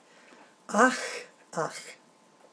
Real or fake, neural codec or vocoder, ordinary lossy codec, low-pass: fake; vocoder, 22.05 kHz, 80 mel bands, WaveNeXt; none; none